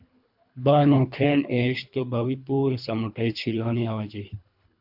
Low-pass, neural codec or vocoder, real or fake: 5.4 kHz; codec, 24 kHz, 3 kbps, HILCodec; fake